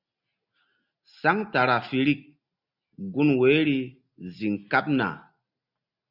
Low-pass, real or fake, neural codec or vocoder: 5.4 kHz; real; none